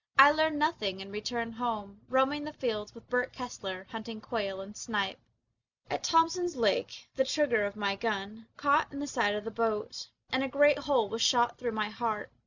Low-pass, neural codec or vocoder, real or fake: 7.2 kHz; none; real